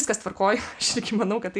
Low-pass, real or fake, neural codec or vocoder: 9.9 kHz; real; none